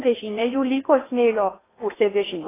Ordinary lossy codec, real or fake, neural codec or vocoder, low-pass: AAC, 16 kbps; fake; codec, 16 kHz in and 24 kHz out, 0.8 kbps, FocalCodec, streaming, 65536 codes; 3.6 kHz